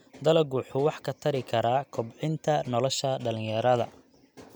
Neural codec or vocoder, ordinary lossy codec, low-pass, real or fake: none; none; none; real